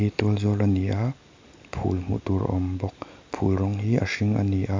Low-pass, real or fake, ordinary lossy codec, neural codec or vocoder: 7.2 kHz; real; MP3, 64 kbps; none